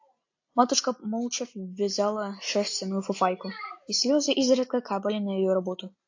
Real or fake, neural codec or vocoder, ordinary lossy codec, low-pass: real; none; AAC, 48 kbps; 7.2 kHz